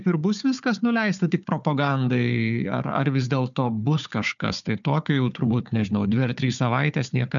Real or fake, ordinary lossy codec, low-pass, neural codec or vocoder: fake; MP3, 96 kbps; 7.2 kHz; codec, 16 kHz, 4 kbps, FunCodec, trained on Chinese and English, 50 frames a second